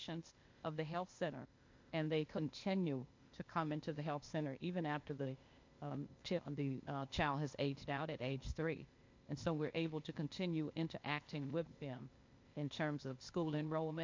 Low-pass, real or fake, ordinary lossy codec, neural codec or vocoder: 7.2 kHz; fake; MP3, 48 kbps; codec, 16 kHz, 0.8 kbps, ZipCodec